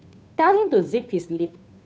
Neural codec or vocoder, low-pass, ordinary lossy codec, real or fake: codec, 16 kHz, 2 kbps, FunCodec, trained on Chinese and English, 25 frames a second; none; none; fake